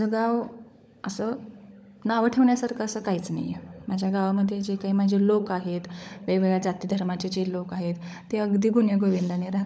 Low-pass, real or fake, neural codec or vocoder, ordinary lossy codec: none; fake; codec, 16 kHz, 16 kbps, FunCodec, trained on LibriTTS, 50 frames a second; none